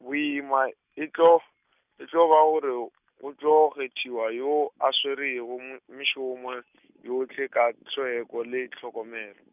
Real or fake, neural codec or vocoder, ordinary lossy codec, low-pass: real; none; none; 3.6 kHz